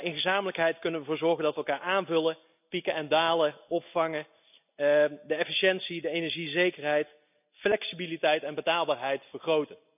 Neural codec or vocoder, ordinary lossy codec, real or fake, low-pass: none; none; real; 3.6 kHz